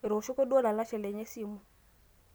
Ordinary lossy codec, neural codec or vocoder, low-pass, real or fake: none; none; none; real